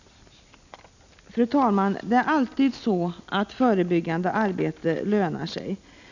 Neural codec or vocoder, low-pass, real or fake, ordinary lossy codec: none; 7.2 kHz; real; none